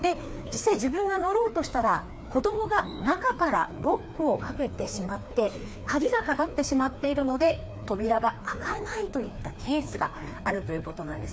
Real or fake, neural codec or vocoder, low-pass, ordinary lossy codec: fake; codec, 16 kHz, 2 kbps, FreqCodec, larger model; none; none